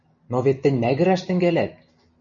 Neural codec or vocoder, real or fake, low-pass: none; real; 7.2 kHz